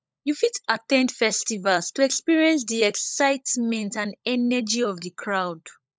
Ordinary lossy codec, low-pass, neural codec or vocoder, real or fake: none; none; codec, 16 kHz, 16 kbps, FunCodec, trained on LibriTTS, 50 frames a second; fake